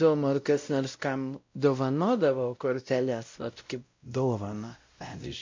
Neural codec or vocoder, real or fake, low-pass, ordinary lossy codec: codec, 16 kHz, 0.5 kbps, X-Codec, WavLM features, trained on Multilingual LibriSpeech; fake; 7.2 kHz; MP3, 48 kbps